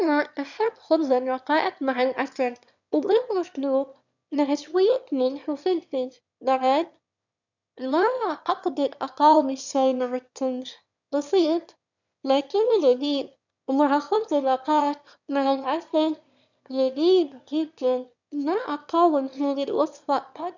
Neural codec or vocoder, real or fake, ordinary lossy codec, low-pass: autoencoder, 22.05 kHz, a latent of 192 numbers a frame, VITS, trained on one speaker; fake; none; 7.2 kHz